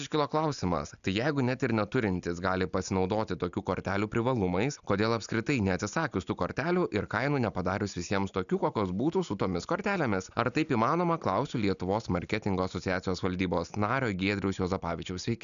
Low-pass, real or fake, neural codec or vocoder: 7.2 kHz; real; none